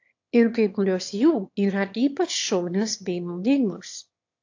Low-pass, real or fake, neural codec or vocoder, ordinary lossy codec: 7.2 kHz; fake; autoencoder, 22.05 kHz, a latent of 192 numbers a frame, VITS, trained on one speaker; AAC, 48 kbps